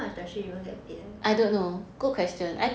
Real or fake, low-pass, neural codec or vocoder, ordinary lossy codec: real; none; none; none